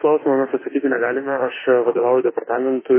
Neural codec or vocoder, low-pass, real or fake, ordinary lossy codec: autoencoder, 48 kHz, 32 numbers a frame, DAC-VAE, trained on Japanese speech; 3.6 kHz; fake; MP3, 16 kbps